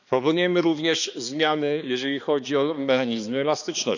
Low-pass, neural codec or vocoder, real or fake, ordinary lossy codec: 7.2 kHz; codec, 16 kHz, 2 kbps, X-Codec, HuBERT features, trained on balanced general audio; fake; none